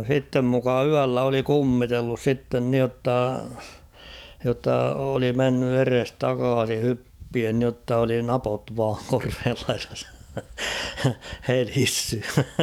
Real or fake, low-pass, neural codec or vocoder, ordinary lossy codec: fake; 19.8 kHz; codec, 44.1 kHz, 7.8 kbps, DAC; none